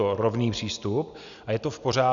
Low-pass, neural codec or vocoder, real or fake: 7.2 kHz; none; real